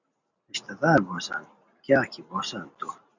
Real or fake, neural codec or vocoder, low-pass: real; none; 7.2 kHz